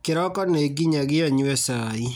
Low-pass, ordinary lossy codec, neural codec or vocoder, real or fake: none; none; none; real